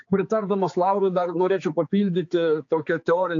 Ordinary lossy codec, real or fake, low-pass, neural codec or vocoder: AAC, 48 kbps; fake; 7.2 kHz; codec, 16 kHz, 4 kbps, X-Codec, HuBERT features, trained on general audio